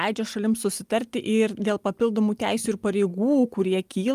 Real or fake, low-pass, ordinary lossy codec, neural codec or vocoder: real; 14.4 kHz; Opus, 32 kbps; none